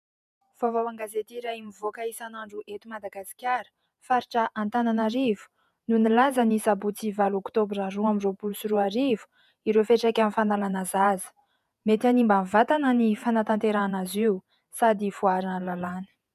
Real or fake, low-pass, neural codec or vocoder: fake; 14.4 kHz; vocoder, 48 kHz, 128 mel bands, Vocos